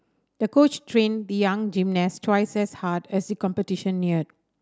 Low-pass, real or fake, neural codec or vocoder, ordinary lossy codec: none; real; none; none